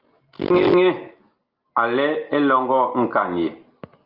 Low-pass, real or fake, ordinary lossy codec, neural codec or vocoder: 5.4 kHz; real; Opus, 24 kbps; none